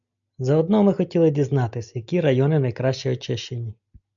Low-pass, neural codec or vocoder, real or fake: 7.2 kHz; none; real